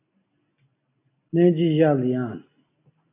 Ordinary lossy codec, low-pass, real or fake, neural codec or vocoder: AAC, 24 kbps; 3.6 kHz; real; none